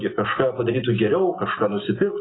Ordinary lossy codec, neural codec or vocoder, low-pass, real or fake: AAC, 16 kbps; none; 7.2 kHz; real